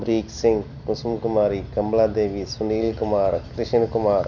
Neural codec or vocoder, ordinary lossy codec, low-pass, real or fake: none; none; 7.2 kHz; real